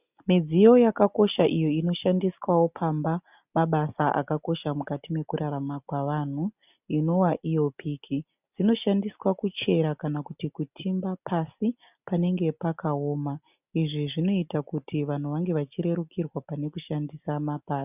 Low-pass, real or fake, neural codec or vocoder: 3.6 kHz; real; none